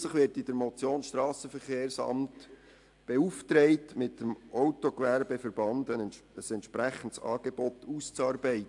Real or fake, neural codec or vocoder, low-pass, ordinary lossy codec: real; none; 10.8 kHz; AAC, 64 kbps